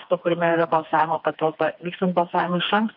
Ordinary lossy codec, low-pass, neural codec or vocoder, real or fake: MP3, 48 kbps; 5.4 kHz; codec, 16 kHz, 2 kbps, FreqCodec, smaller model; fake